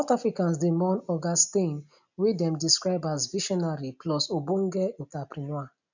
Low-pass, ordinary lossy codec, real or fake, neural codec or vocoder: 7.2 kHz; none; real; none